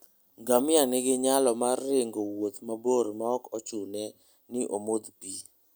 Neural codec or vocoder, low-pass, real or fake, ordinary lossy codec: none; none; real; none